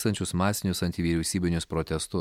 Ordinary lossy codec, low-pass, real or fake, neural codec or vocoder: MP3, 96 kbps; 19.8 kHz; real; none